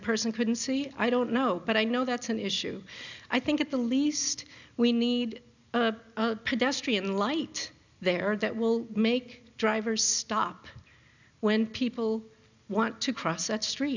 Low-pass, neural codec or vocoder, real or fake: 7.2 kHz; none; real